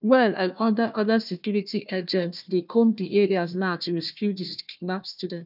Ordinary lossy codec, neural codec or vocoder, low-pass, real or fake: none; codec, 16 kHz, 1 kbps, FunCodec, trained on Chinese and English, 50 frames a second; 5.4 kHz; fake